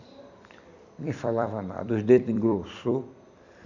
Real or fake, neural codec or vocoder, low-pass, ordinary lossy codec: fake; vocoder, 44.1 kHz, 128 mel bands every 256 samples, BigVGAN v2; 7.2 kHz; none